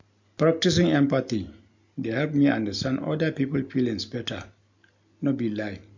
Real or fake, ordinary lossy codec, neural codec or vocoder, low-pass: real; MP3, 64 kbps; none; 7.2 kHz